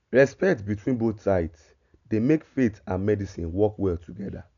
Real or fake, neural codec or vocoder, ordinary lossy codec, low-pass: real; none; MP3, 96 kbps; 7.2 kHz